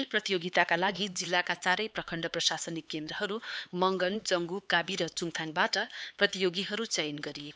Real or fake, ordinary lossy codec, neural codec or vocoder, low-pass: fake; none; codec, 16 kHz, 4 kbps, X-Codec, HuBERT features, trained on LibriSpeech; none